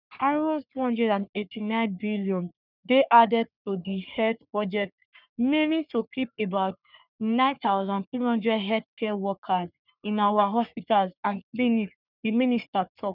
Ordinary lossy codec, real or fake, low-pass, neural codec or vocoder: none; fake; 5.4 kHz; codec, 44.1 kHz, 3.4 kbps, Pupu-Codec